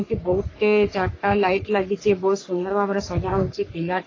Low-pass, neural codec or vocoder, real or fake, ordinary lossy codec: 7.2 kHz; codec, 44.1 kHz, 3.4 kbps, Pupu-Codec; fake; AAC, 32 kbps